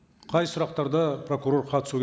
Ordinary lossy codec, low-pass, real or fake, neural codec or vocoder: none; none; real; none